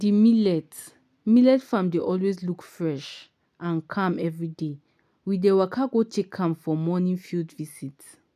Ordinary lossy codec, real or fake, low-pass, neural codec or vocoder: none; real; 14.4 kHz; none